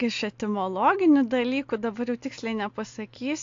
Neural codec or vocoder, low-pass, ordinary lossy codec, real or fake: none; 7.2 kHz; AAC, 48 kbps; real